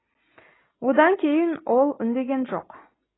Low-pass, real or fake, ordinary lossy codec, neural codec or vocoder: 7.2 kHz; real; AAC, 16 kbps; none